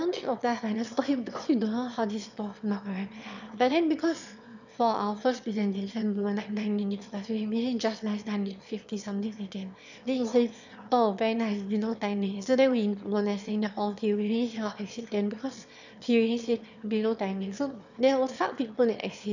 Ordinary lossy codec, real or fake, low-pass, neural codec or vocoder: none; fake; 7.2 kHz; autoencoder, 22.05 kHz, a latent of 192 numbers a frame, VITS, trained on one speaker